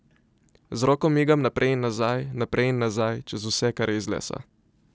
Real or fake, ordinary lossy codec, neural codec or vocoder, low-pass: real; none; none; none